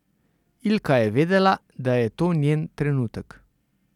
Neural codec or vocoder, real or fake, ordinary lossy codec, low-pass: vocoder, 44.1 kHz, 128 mel bands every 256 samples, BigVGAN v2; fake; none; 19.8 kHz